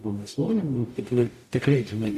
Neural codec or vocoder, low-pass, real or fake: codec, 44.1 kHz, 0.9 kbps, DAC; 14.4 kHz; fake